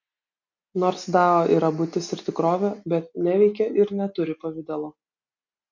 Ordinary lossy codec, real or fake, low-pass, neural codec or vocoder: MP3, 48 kbps; real; 7.2 kHz; none